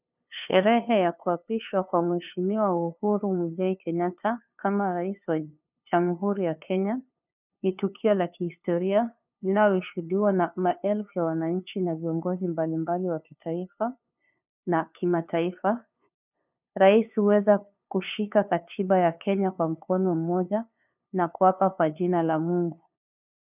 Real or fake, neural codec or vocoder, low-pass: fake; codec, 16 kHz, 2 kbps, FunCodec, trained on LibriTTS, 25 frames a second; 3.6 kHz